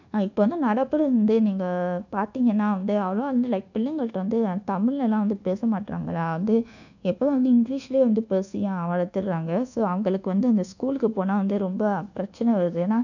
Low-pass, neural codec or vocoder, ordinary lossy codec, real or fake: 7.2 kHz; codec, 24 kHz, 1.2 kbps, DualCodec; none; fake